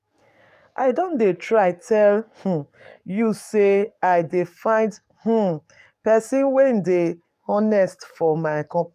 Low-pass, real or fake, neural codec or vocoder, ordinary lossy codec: 14.4 kHz; fake; codec, 44.1 kHz, 7.8 kbps, DAC; none